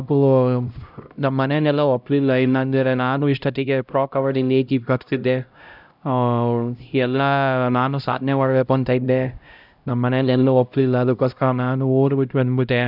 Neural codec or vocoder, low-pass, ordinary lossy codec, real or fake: codec, 16 kHz, 0.5 kbps, X-Codec, HuBERT features, trained on LibriSpeech; 5.4 kHz; none; fake